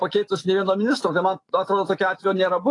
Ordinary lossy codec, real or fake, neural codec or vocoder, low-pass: AAC, 32 kbps; fake; autoencoder, 48 kHz, 128 numbers a frame, DAC-VAE, trained on Japanese speech; 10.8 kHz